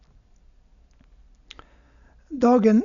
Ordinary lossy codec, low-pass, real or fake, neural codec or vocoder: none; 7.2 kHz; real; none